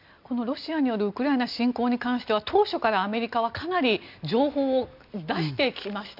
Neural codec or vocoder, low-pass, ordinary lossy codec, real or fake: none; 5.4 kHz; none; real